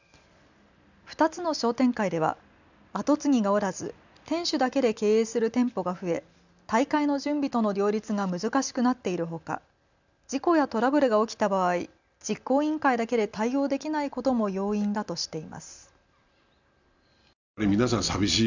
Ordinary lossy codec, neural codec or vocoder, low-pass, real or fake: none; none; 7.2 kHz; real